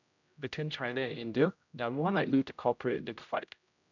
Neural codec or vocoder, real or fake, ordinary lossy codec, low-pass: codec, 16 kHz, 0.5 kbps, X-Codec, HuBERT features, trained on general audio; fake; none; 7.2 kHz